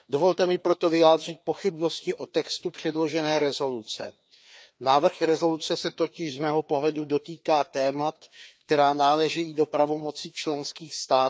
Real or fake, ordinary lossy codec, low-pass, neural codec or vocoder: fake; none; none; codec, 16 kHz, 2 kbps, FreqCodec, larger model